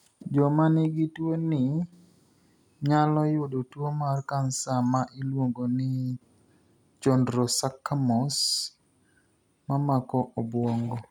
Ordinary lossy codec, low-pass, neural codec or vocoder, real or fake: none; 19.8 kHz; none; real